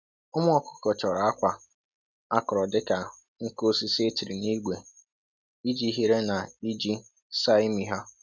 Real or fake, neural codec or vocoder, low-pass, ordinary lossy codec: real; none; 7.2 kHz; none